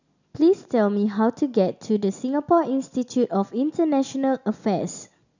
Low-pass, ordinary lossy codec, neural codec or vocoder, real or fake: 7.2 kHz; none; none; real